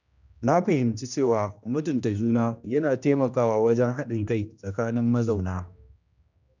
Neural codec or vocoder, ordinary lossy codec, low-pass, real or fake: codec, 16 kHz, 1 kbps, X-Codec, HuBERT features, trained on general audio; none; 7.2 kHz; fake